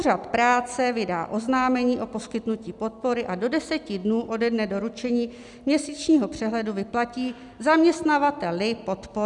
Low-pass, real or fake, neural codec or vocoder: 10.8 kHz; real; none